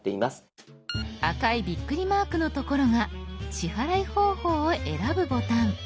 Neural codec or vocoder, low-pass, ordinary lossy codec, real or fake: none; none; none; real